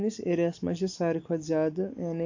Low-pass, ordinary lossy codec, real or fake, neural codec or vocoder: 7.2 kHz; AAC, 48 kbps; real; none